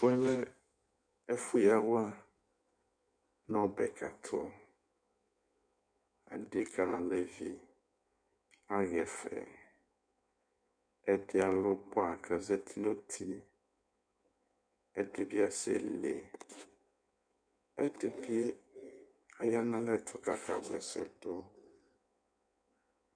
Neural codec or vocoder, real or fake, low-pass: codec, 16 kHz in and 24 kHz out, 1.1 kbps, FireRedTTS-2 codec; fake; 9.9 kHz